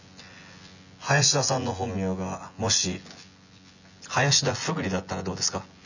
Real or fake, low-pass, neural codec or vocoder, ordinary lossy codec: fake; 7.2 kHz; vocoder, 24 kHz, 100 mel bands, Vocos; none